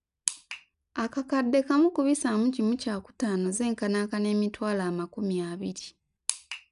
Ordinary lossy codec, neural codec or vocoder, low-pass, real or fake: none; none; 10.8 kHz; real